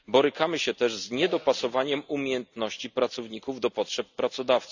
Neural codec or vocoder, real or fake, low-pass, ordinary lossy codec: none; real; 7.2 kHz; none